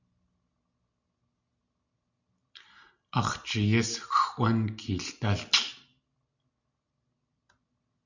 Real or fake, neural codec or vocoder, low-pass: real; none; 7.2 kHz